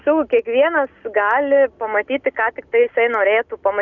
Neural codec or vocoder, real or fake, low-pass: none; real; 7.2 kHz